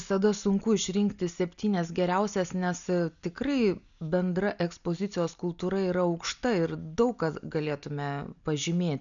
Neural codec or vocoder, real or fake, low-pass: none; real; 7.2 kHz